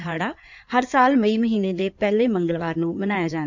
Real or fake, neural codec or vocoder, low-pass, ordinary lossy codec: fake; codec, 16 kHz in and 24 kHz out, 2.2 kbps, FireRedTTS-2 codec; 7.2 kHz; none